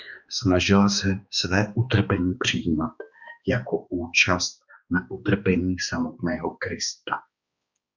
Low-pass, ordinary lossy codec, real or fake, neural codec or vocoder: 7.2 kHz; Opus, 64 kbps; fake; codec, 16 kHz, 2 kbps, X-Codec, HuBERT features, trained on balanced general audio